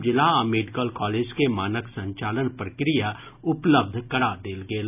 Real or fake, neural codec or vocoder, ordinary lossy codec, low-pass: real; none; none; 3.6 kHz